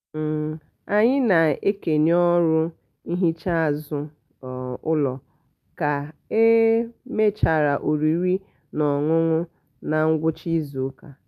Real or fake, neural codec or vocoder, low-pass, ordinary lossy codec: real; none; 14.4 kHz; none